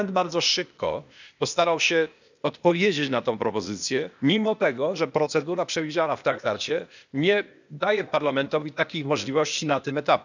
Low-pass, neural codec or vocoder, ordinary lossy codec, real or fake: 7.2 kHz; codec, 16 kHz, 0.8 kbps, ZipCodec; none; fake